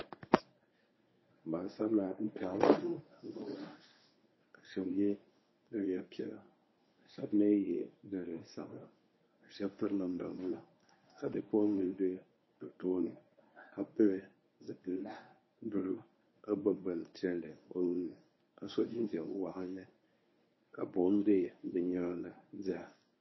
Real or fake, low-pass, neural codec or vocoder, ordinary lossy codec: fake; 7.2 kHz; codec, 24 kHz, 0.9 kbps, WavTokenizer, medium speech release version 1; MP3, 24 kbps